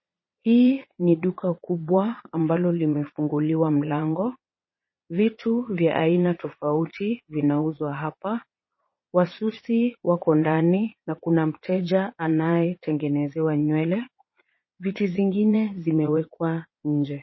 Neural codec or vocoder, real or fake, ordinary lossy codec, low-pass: vocoder, 22.05 kHz, 80 mel bands, Vocos; fake; MP3, 24 kbps; 7.2 kHz